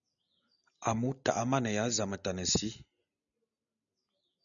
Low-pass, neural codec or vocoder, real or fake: 7.2 kHz; none; real